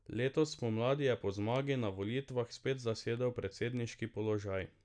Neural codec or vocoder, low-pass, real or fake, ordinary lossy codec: none; 9.9 kHz; real; none